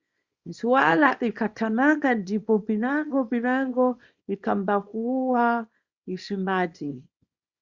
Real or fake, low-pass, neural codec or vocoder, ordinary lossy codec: fake; 7.2 kHz; codec, 24 kHz, 0.9 kbps, WavTokenizer, small release; Opus, 64 kbps